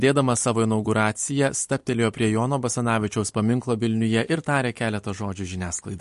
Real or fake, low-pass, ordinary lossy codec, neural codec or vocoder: fake; 14.4 kHz; MP3, 48 kbps; vocoder, 44.1 kHz, 128 mel bands every 256 samples, BigVGAN v2